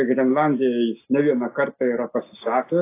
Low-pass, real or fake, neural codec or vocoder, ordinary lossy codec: 3.6 kHz; real; none; AAC, 24 kbps